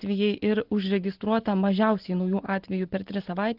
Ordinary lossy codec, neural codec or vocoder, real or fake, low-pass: Opus, 16 kbps; none; real; 5.4 kHz